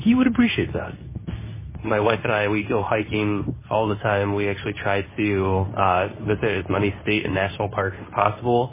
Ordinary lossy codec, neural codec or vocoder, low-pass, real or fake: MP3, 16 kbps; codec, 24 kHz, 0.9 kbps, WavTokenizer, medium speech release version 2; 3.6 kHz; fake